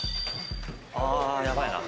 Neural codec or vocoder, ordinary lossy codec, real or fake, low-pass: none; none; real; none